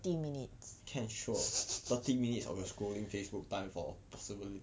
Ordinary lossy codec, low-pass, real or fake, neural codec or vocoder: none; none; real; none